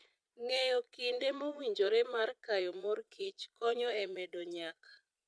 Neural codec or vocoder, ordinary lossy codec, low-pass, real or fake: vocoder, 22.05 kHz, 80 mel bands, Vocos; none; none; fake